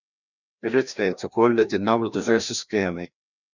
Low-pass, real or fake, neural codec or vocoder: 7.2 kHz; fake; codec, 16 kHz, 1 kbps, FreqCodec, larger model